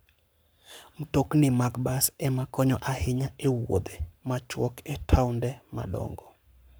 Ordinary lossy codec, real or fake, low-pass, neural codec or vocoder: none; fake; none; codec, 44.1 kHz, 7.8 kbps, Pupu-Codec